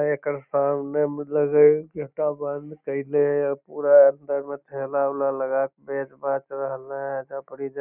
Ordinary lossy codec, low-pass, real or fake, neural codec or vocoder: none; 3.6 kHz; real; none